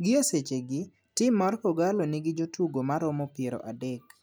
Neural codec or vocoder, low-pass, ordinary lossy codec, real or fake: none; none; none; real